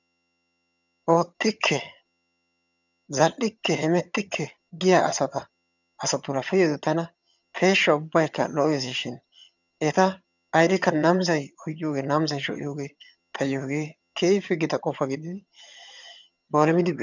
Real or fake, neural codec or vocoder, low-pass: fake; vocoder, 22.05 kHz, 80 mel bands, HiFi-GAN; 7.2 kHz